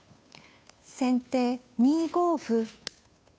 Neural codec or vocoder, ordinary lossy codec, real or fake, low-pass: codec, 16 kHz, 2 kbps, FunCodec, trained on Chinese and English, 25 frames a second; none; fake; none